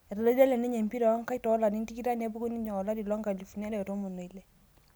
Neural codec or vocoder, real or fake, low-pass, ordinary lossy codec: none; real; none; none